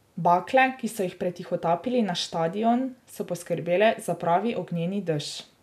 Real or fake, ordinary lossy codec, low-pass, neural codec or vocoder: real; none; 14.4 kHz; none